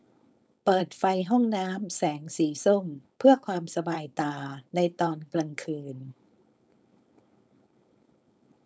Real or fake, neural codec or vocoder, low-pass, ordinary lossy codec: fake; codec, 16 kHz, 4.8 kbps, FACodec; none; none